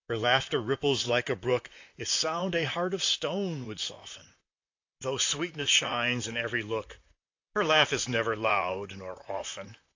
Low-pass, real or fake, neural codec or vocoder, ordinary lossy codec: 7.2 kHz; fake; vocoder, 44.1 kHz, 128 mel bands, Pupu-Vocoder; AAC, 48 kbps